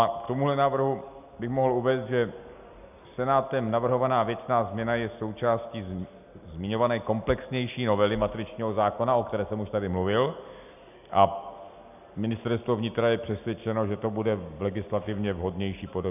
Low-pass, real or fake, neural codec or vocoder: 3.6 kHz; real; none